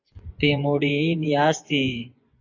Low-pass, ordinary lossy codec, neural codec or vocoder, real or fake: 7.2 kHz; AAC, 48 kbps; vocoder, 24 kHz, 100 mel bands, Vocos; fake